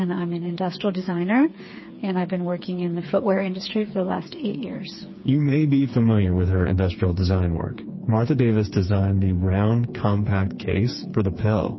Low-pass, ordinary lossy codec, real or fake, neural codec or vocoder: 7.2 kHz; MP3, 24 kbps; fake; codec, 16 kHz, 4 kbps, FreqCodec, smaller model